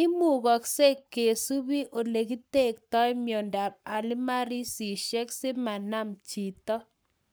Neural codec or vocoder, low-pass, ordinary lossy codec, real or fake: codec, 44.1 kHz, 7.8 kbps, Pupu-Codec; none; none; fake